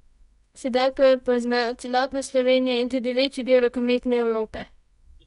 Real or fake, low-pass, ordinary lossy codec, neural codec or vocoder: fake; 10.8 kHz; none; codec, 24 kHz, 0.9 kbps, WavTokenizer, medium music audio release